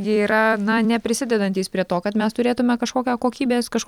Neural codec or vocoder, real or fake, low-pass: vocoder, 44.1 kHz, 128 mel bands every 256 samples, BigVGAN v2; fake; 19.8 kHz